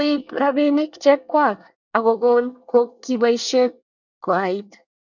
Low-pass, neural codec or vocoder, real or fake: 7.2 kHz; codec, 24 kHz, 1 kbps, SNAC; fake